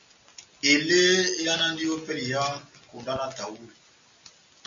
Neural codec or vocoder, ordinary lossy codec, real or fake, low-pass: none; AAC, 64 kbps; real; 7.2 kHz